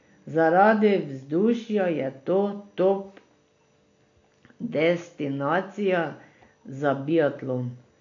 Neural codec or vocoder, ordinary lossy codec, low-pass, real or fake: none; AAC, 48 kbps; 7.2 kHz; real